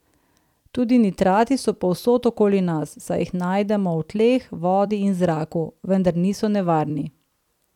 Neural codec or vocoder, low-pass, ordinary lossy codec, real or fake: vocoder, 44.1 kHz, 128 mel bands every 256 samples, BigVGAN v2; 19.8 kHz; none; fake